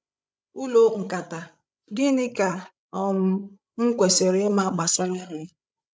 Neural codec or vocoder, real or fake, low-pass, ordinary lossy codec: codec, 16 kHz, 16 kbps, FreqCodec, larger model; fake; none; none